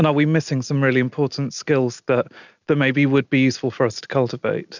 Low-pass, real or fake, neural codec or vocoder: 7.2 kHz; real; none